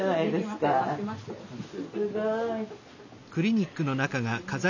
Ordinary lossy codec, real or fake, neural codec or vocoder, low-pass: none; real; none; 7.2 kHz